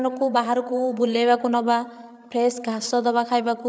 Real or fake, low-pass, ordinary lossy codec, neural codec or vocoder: fake; none; none; codec, 16 kHz, 8 kbps, FreqCodec, larger model